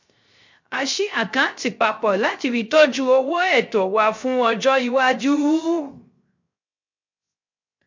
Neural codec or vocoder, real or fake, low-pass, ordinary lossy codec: codec, 16 kHz, 0.3 kbps, FocalCodec; fake; 7.2 kHz; MP3, 48 kbps